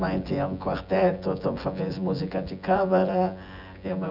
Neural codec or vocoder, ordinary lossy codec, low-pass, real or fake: vocoder, 24 kHz, 100 mel bands, Vocos; none; 5.4 kHz; fake